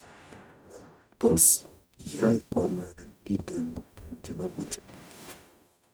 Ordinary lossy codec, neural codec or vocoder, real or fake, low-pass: none; codec, 44.1 kHz, 0.9 kbps, DAC; fake; none